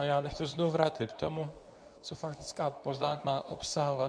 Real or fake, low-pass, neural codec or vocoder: fake; 9.9 kHz; codec, 24 kHz, 0.9 kbps, WavTokenizer, medium speech release version 2